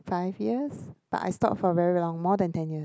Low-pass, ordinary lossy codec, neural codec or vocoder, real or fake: none; none; none; real